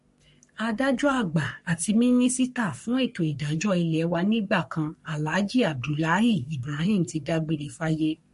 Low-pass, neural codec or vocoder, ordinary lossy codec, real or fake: 14.4 kHz; codec, 44.1 kHz, 3.4 kbps, Pupu-Codec; MP3, 48 kbps; fake